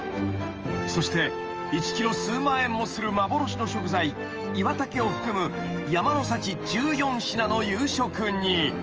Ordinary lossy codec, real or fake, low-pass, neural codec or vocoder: Opus, 24 kbps; fake; 7.2 kHz; autoencoder, 48 kHz, 128 numbers a frame, DAC-VAE, trained on Japanese speech